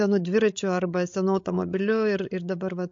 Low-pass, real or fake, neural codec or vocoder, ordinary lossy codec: 7.2 kHz; fake; codec, 16 kHz, 16 kbps, FreqCodec, larger model; MP3, 48 kbps